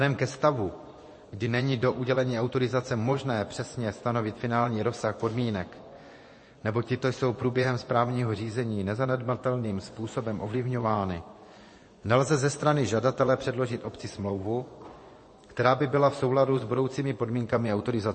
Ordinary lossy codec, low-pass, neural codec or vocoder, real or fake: MP3, 32 kbps; 9.9 kHz; vocoder, 24 kHz, 100 mel bands, Vocos; fake